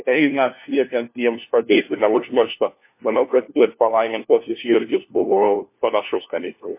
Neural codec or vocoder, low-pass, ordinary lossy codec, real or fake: codec, 16 kHz, 1 kbps, FunCodec, trained on LibriTTS, 50 frames a second; 3.6 kHz; MP3, 24 kbps; fake